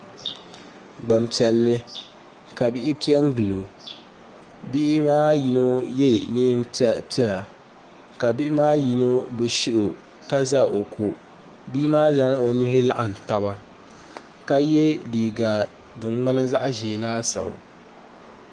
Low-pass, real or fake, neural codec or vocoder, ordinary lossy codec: 9.9 kHz; fake; codec, 32 kHz, 1.9 kbps, SNAC; Opus, 24 kbps